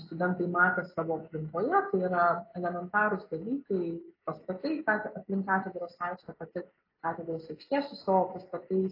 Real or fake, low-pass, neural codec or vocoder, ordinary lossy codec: real; 5.4 kHz; none; AAC, 32 kbps